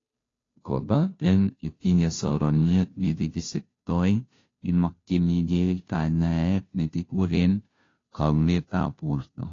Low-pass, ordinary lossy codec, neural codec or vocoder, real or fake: 7.2 kHz; AAC, 32 kbps; codec, 16 kHz, 0.5 kbps, FunCodec, trained on Chinese and English, 25 frames a second; fake